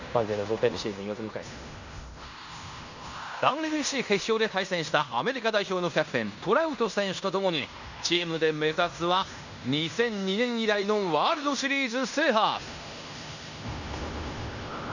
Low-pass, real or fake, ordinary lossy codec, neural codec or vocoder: 7.2 kHz; fake; none; codec, 16 kHz in and 24 kHz out, 0.9 kbps, LongCat-Audio-Codec, fine tuned four codebook decoder